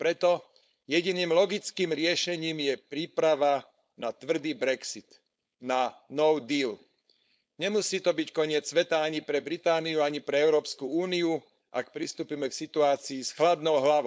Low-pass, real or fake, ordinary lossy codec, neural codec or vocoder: none; fake; none; codec, 16 kHz, 4.8 kbps, FACodec